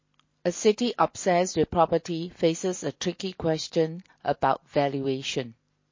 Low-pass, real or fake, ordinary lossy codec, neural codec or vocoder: 7.2 kHz; real; MP3, 32 kbps; none